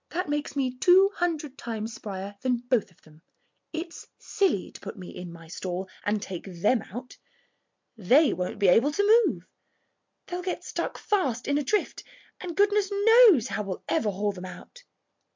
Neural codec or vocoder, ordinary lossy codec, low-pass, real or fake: none; MP3, 64 kbps; 7.2 kHz; real